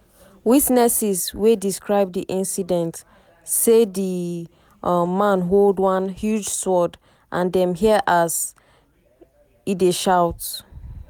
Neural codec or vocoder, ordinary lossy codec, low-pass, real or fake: none; none; none; real